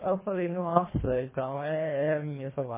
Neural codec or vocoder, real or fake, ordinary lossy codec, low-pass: codec, 24 kHz, 1.5 kbps, HILCodec; fake; MP3, 16 kbps; 3.6 kHz